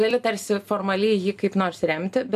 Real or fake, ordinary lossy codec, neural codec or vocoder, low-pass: real; AAC, 96 kbps; none; 14.4 kHz